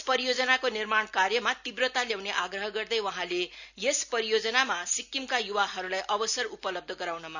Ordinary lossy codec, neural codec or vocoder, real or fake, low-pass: none; none; real; 7.2 kHz